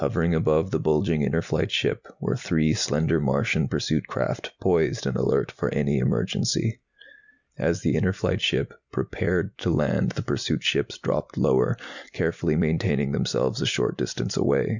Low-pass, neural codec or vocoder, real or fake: 7.2 kHz; none; real